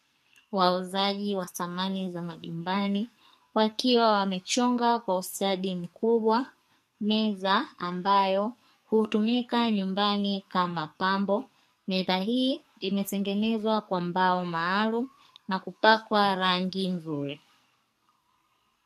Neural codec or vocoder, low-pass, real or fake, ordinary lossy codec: codec, 44.1 kHz, 2.6 kbps, SNAC; 14.4 kHz; fake; MP3, 64 kbps